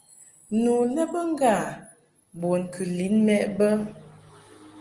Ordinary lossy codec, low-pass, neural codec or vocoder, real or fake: Opus, 24 kbps; 10.8 kHz; none; real